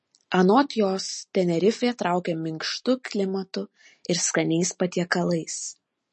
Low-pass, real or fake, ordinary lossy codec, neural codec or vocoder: 10.8 kHz; real; MP3, 32 kbps; none